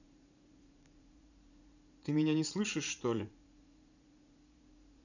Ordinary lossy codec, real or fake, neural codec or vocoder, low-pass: none; fake; vocoder, 44.1 kHz, 80 mel bands, Vocos; 7.2 kHz